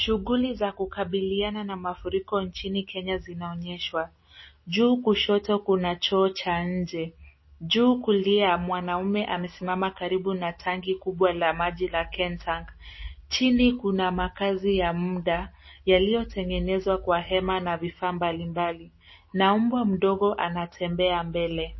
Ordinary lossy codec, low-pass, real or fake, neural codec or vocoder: MP3, 24 kbps; 7.2 kHz; real; none